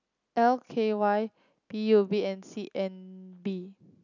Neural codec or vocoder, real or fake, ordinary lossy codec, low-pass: none; real; none; 7.2 kHz